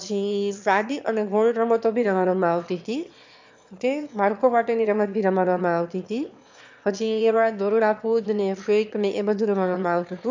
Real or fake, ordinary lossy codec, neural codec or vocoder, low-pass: fake; MP3, 64 kbps; autoencoder, 22.05 kHz, a latent of 192 numbers a frame, VITS, trained on one speaker; 7.2 kHz